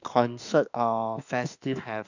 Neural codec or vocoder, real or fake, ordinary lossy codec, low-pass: autoencoder, 48 kHz, 32 numbers a frame, DAC-VAE, trained on Japanese speech; fake; none; 7.2 kHz